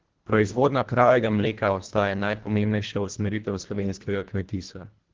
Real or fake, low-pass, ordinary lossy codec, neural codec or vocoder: fake; 7.2 kHz; Opus, 16 kbps; codec, 24 kHz, 1.5 kbps, HILCodec